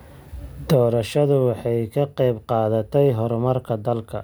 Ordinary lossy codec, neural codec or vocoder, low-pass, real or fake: none; none; none; real